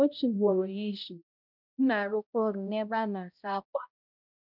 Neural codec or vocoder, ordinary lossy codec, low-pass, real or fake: codec, 16 kHz, 0.5 kbps, X-Codec, HuBERT features, trained on balanced general audio; none; 5.4 kHz; fake